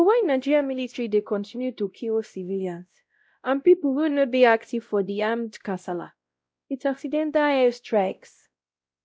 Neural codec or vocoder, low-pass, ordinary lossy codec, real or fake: codec, 16 kHz, 0.5 kbps, X-Codec, WavLM features, trained on Multilingual LibriSpeech; none; none; fake